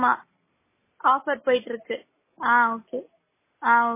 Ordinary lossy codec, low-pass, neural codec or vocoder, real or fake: MP3, 16 kbps; 3.6 kHz; none; real